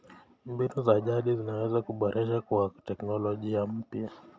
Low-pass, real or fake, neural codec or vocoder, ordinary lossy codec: none; real; none; none